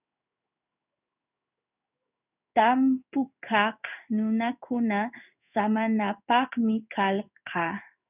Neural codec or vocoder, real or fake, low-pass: codec, 16 kHz in and 24 kHz out, 1 kbps, XY-Tokenizer; fake; 3.6 kHz